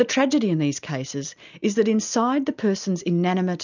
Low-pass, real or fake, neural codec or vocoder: 7.2 kHz; real; none